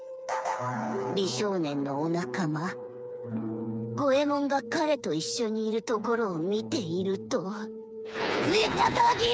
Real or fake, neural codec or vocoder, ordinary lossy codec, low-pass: fake; codec, 16 kHz, 4 kbps, FreqCodec, smaller model; none; none